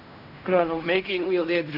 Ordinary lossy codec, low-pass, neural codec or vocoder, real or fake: none; 5.4 kHz; codec, 16 kHz in and 24 kHz out, 0.4 kbps, LongCat-Audio-Codec, fine tuned four codebook decoder; fake